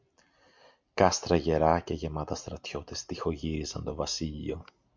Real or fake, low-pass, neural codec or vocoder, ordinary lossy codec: real; 7.2 kHz; none; MP3, 64 kbps